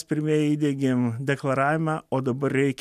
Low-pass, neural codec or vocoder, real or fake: 14.4 kHz; none; real